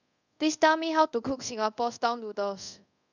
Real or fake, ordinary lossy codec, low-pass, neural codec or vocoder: fake; none; 7.2 kHz; codec, 24 kHz, 0.5 kbps, DualCodec